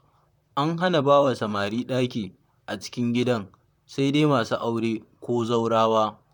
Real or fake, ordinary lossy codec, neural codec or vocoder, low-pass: fake; none; vocoder, 44.1 kHz, 128 mel bands, Pupu-Vocoder; 19.8 kHz